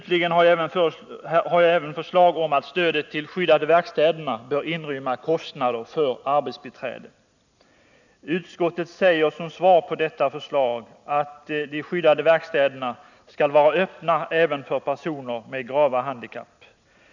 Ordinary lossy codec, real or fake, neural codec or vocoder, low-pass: none; real; none; 7.2 kHz